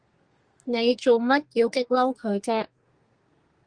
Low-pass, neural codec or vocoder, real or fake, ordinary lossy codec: 9.9 kHz; codec, 32 kHz, 1.9 kbps, SNAC; fake; Opus, 24 kbps